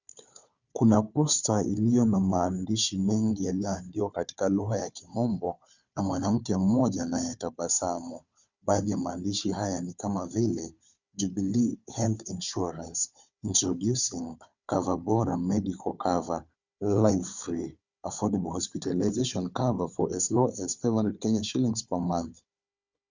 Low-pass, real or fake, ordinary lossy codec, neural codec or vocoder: 7.2 kHz; fake; Opus, 64 kbps; codec, 16 kHz, 4 kbps, FunCodec, trained on Chinese and English, 50 frames a second